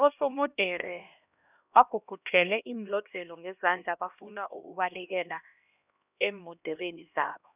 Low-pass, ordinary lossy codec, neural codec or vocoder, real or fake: 3.6 kHz; none; codec, 16 kHz, 1 kbps, X-Codec, HuBERT features, trained on LibriSpeech; fake